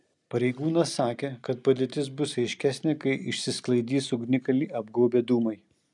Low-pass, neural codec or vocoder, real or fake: 10.8 kHz; none; real